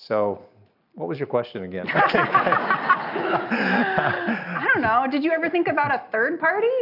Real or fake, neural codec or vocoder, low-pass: real; none; 5.4 kHz